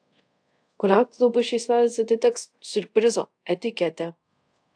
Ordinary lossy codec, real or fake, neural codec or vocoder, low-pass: AAC, 64 kbps; fake; codec, 24 kHz, 0.5 kbps, DualCodec; 9.9 kHz